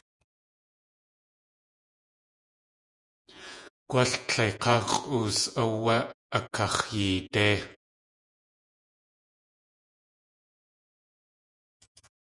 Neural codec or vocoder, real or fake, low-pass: vocoder, 48 kHz, 128 mel bands, Vocos; fake; 10.8 kHz